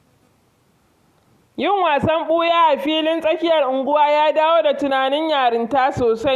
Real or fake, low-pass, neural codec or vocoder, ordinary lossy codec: real; 14.4 kHz; none; Opus, 64 kbps